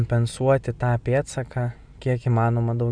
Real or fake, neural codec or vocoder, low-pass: real; none; 9.9 kHz